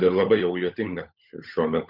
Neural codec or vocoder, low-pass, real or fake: codec, 16 kHz, 16 kbps, FunCodec, trained on LibriTTS, 50 frames a second; 5.4 kHz; fake